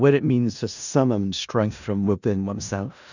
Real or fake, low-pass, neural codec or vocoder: fake; 7.2 kHz; codec, 16 kHz in and 24 kHz out, 0.4 kbps, LongCat-Audio-Codec, four codebook decoder